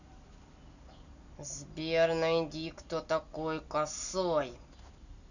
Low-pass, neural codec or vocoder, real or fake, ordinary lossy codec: 7.2 kHz; none; real; none